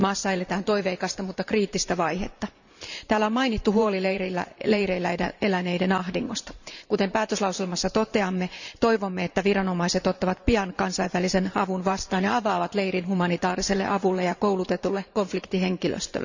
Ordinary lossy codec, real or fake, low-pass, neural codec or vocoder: none; fake; 7.2 kHz; vocoder, 44.1 kHz, 128 mel bands every 512 samples, BigVGAN v2